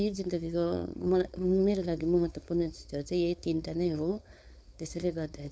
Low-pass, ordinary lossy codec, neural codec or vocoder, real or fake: none; none; codec, 16 kHz, 4.8 kbps, FACodec; fake